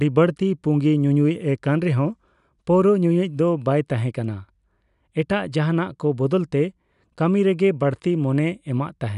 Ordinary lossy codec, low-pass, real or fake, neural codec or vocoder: none; 10.8 kHz; real; none